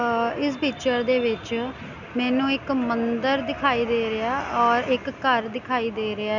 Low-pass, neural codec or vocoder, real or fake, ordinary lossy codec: 7.2 kHz; none; real; none